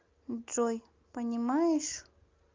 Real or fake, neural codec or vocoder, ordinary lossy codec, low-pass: real; none; Opus, 32 kbps; 7.2 kHz